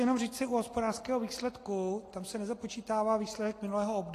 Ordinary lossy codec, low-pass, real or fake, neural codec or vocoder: AAC, 48 kbps; 14.4 kHz; real; none